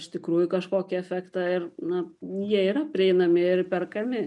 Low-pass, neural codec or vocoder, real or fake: 10.8 kHz; none; real